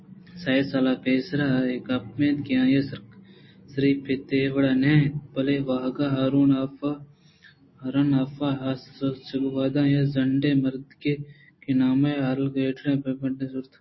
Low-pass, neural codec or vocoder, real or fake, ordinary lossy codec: 7.2 kHz; none; real; MP3, 24 kbps